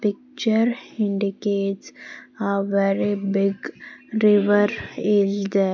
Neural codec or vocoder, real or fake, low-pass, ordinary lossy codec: none; real; 7.2 kHz; MP3, 64 kbps